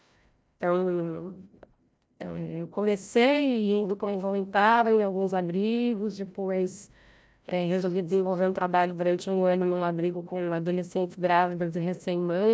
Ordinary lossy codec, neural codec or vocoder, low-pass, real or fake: none; codec, 16 kHz, 0.5 kbps, FreqCodec, larger model; none; fake